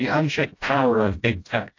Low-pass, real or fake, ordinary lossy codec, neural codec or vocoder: 7.2 kHz; fake; AAC, 48 kbps; codec, 16 kHz, 0.5 kbps, FreqCodec, smaller model